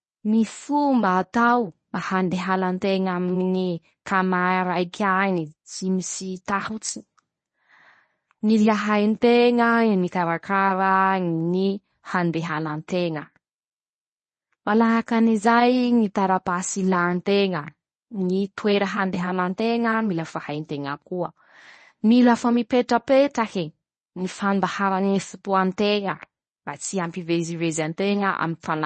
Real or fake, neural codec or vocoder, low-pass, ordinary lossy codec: fake; codec, 24 kHz, 0.9 kbps, WavTokenizer, medium speech release version 1; 10.8 kHz; MP3, 32 kbps